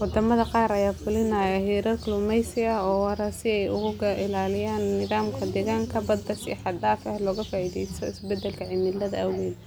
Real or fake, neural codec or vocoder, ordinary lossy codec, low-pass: real; none; none; none